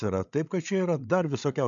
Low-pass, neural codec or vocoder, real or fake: 7.2 kHz; codec, 16 kHz, 8 kbps, FreqCodec, larger model; fake